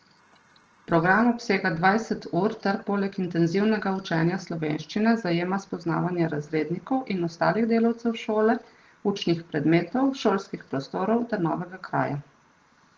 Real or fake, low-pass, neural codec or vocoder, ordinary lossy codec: real; 7.2 kHz; none; Opus, 16 kbps